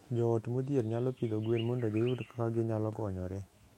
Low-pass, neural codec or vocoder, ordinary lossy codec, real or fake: 19.8 kHz; autoencoder, 48 kHz, 128 numbers a frame, DAC-VAE, trained on Japanese speech; MP3, 64 kbps; fake